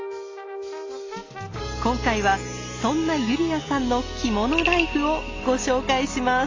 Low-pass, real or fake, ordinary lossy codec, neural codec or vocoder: 7.2 kHz; real; AAC, 32 kbps; none